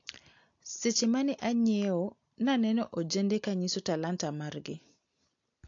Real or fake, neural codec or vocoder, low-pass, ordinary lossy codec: real; none; 7.2 kHz; AAC, 48 kbps